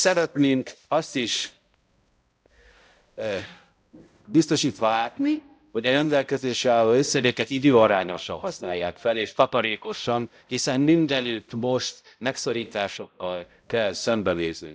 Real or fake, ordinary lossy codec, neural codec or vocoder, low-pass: fake; none; codec, 16 kHz, 0.5 kbps, X-Codec, HuBERT features, trained on balanced general audio; none